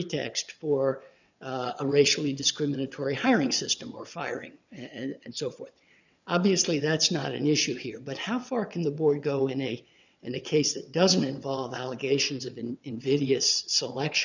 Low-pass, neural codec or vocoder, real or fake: 7.2 kHz; vocoder, 22.05 kHz, 80 mel bands, WaveNeXt; fake